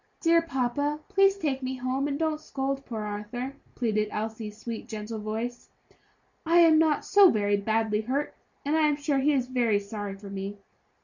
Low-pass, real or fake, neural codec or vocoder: 7.2 kHz; real; none